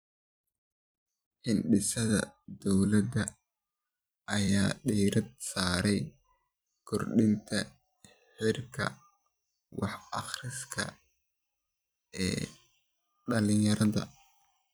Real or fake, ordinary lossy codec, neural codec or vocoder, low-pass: fake; none; vocoder, 44.1 kHz, 128 mel bands every 256 samples, BigVGAN v2; none